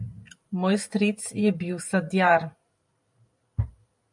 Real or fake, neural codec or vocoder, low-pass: fake; vocoder, 44.1 kHz, 128 mel bands every 512 samples, BigVGAN v2; 10.8 kHz